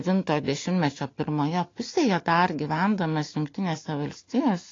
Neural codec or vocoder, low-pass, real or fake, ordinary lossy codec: none; 7.2 kHz; real; AAC, 32 kbps